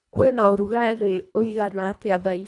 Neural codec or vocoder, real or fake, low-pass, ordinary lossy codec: codec, 24 kHz, 1.5 kbps, HILCodec; fake; 10.8 kHz; none